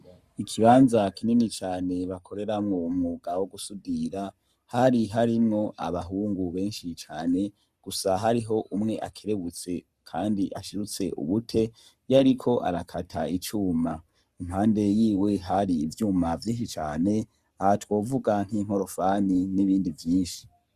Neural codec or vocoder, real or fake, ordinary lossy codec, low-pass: codec, 44.1 kHz, 7.8 kbps, Pupu-Codec; fake; Opus, 64 kbps; 14.4 kHz